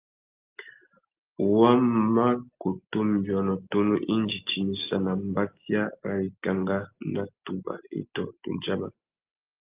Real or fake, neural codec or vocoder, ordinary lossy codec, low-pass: real; none; Opus, 24 kbps; 3.6 kHz